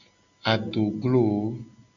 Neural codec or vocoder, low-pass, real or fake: none; 7.2 kHz; real